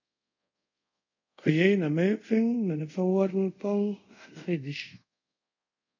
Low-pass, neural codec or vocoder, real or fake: 7.2 kHz; codec, 24 kHz, 0.5 kbps, DualCodec; fake